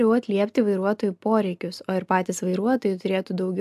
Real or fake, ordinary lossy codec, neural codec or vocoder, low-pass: real; AAC, 96 kbps; none; 14.4 kHz